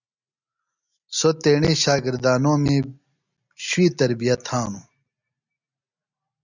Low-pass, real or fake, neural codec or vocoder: 7.2 kHz; real; none